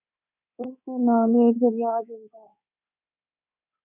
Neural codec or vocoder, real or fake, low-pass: codec, 24 kHz, 3.1 kbps, DualCodec; fake; 3.6 kHz